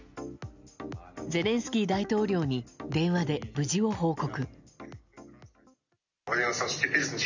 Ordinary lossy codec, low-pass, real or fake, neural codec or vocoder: none; 7.2 kHz; real; none